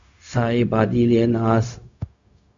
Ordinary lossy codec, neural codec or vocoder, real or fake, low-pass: MP3, 64 kbps; codec, 16 kHz, 0.4 kbps, LongCat-Audio-Codec; fake; 7.2 kHz